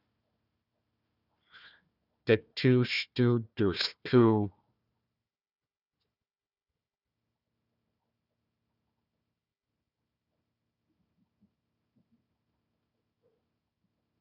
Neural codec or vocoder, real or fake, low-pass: codec, 16 kHz, 1 kbps, FunCodec, trained on Chinese and English, 50 frames a second; fake; 5.4 kHz